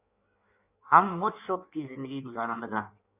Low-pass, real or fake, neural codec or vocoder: 3.6 kHz; fake; codec, 16 kHz in and 24 kHz out, 1.1 kbps, FireRedTTS-2 codec